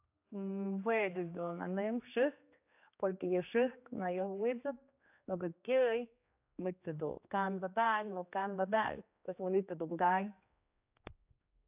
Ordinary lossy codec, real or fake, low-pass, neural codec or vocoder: AAC, 32 kbps; fake; 3.6 kHz; codec, 16 kHz, 2 kbps, X-Codec, HuBERT features, trained on general audio